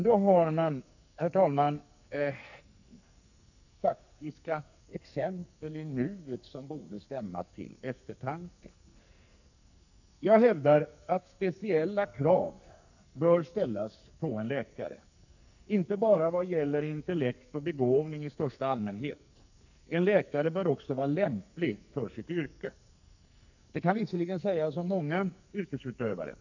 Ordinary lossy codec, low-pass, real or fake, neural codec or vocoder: none; 7.2 kHz; fake; codec, 44.1 kHz, 2.6 kbps, SNAC